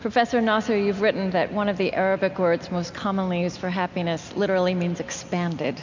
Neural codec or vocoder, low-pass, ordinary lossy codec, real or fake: none; 7.2 kHz; MP3, 64 kbps; real